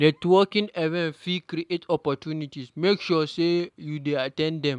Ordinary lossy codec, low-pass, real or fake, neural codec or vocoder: none; 10.8 kHz; real; none